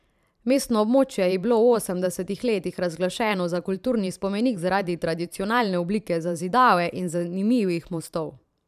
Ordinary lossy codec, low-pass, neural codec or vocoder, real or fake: none; 14.4 kHz; vocoder, 44.1 kHz, 128 mel bands every 512 samples, BigVGAN v2; fake